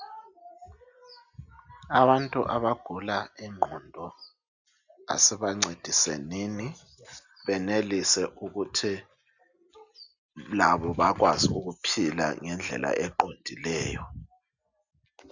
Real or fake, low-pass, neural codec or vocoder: real; 7.2 kHz; none